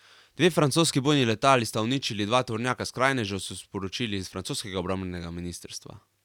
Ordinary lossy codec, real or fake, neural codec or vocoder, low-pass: none; real; none; 19.8 kHz